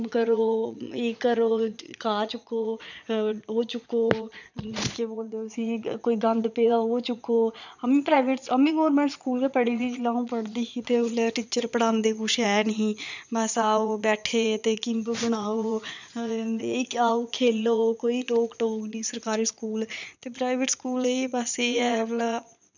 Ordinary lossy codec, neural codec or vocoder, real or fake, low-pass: none; vocoder, 22.05 kHz, 80 mel bands, Vocos; fake; 7.2 kHz